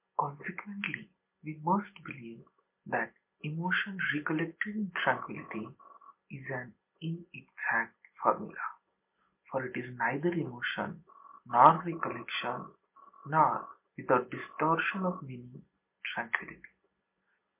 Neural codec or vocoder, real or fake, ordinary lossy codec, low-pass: none; real; MP3, 32 kbps; 3.6 kHz